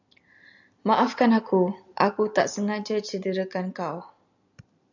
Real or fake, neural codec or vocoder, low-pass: real; none; 7.2 kHz